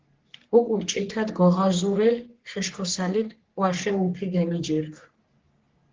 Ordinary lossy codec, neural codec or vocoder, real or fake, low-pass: Opus, 16 kbps; codec, 44.1 kHz, 3.4 kbps, Pupu-Codec; fake; 7.2 kHz